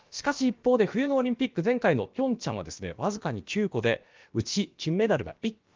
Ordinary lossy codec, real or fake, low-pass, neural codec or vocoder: Opus, 32 kbps; fake; 7.2 kHz; codec, 16 kHz, about 1 kbps, DyCAST, with the encoder's durations